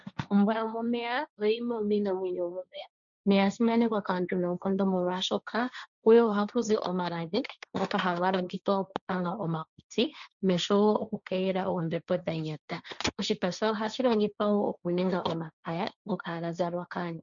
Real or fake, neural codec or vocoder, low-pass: fake; codec, 16 kHz, 1.1 kbps, Voila-Tokenizer; 7.2 kHz